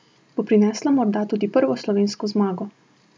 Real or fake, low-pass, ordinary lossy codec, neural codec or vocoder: real; none; none; none